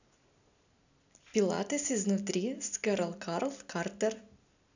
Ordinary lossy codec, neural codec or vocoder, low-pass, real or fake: none; none; 7.2 kHz; real